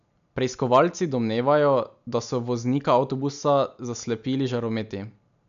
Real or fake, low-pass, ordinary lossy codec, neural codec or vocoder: real; 7.2 kHz; none; none